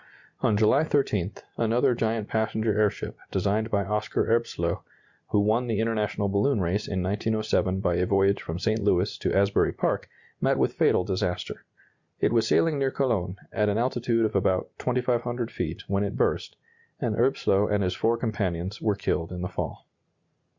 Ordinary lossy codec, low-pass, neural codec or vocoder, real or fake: Opus, 64 kbps; 7.2 kHz; none; real